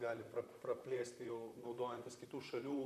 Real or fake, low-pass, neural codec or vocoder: fake; 14.4 kHz; vocoder, 44.1 kHz, 128 mel bands, Pupu-Vocoder